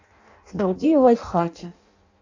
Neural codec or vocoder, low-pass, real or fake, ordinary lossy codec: codec, 16 kHz in and 24 kHz out, 0.6 kbps, FireRedTTS-2 codec; 7.2 kHz; fake; AAC, 48 kbps